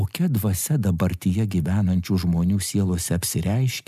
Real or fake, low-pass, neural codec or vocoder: real; 14.4 kHz; none